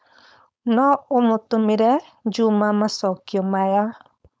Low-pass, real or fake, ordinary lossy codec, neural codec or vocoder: none; fake; none; codec, 16 kHz, 4.8 kbps, FACodec